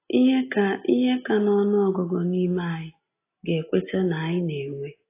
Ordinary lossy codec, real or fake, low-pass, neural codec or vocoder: AAC, 24 kbps; real; 3.6 kHz; none